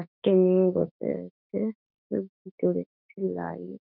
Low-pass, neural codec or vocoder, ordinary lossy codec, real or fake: 5.4 kHz; autoencoder, 48 kHz, 32 numbers a frame, DAC-VAE, trained on Japanese speech; none; fake